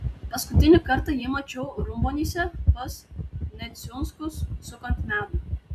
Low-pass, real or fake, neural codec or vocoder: 14.4 kHz; real; none